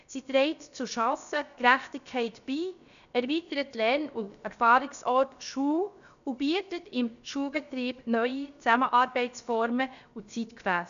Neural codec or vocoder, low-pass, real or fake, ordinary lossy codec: codec, 16 kHz, about 1 kbps, DyCAST, with the encoder's durations; 7.2 kHz; fake; none